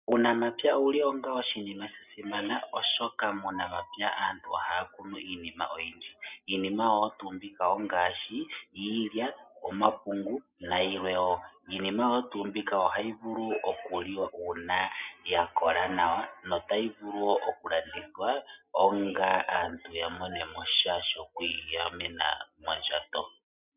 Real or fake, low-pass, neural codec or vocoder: real; 3.6 kHz; none